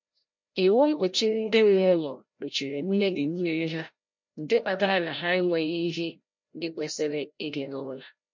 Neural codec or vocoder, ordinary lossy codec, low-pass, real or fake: codec, 16 kHz, 0.5 kbps, FreqCodec, larger model; MP3, 48 kbps; 7.2 kHz; fake